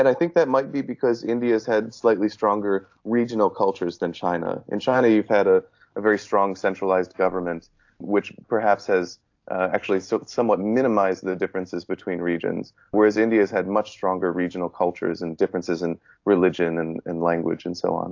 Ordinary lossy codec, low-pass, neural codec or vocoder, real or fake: AAC, 48 kbps; 7.2 kHz; none; real